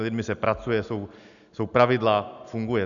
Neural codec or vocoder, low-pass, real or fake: none; 7.2 kHz; real